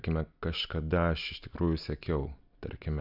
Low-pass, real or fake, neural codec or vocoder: 5.4 kHz; real; none